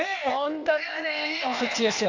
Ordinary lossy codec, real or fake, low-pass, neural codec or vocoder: AAC, 48 kbps; fake; 7.2 kHz; codec, 16 kHz, 0.8 kbps, ZipCodec